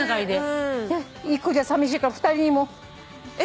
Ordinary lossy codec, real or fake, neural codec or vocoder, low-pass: none; real; none; none